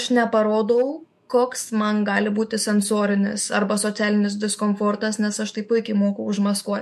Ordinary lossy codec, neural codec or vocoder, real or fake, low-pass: MP3, 64 kbps; autoencoder, 48 kHz, 128 numbers a frame, DAC-VAE, trained on Japanese speech; fake; 14.4 kHz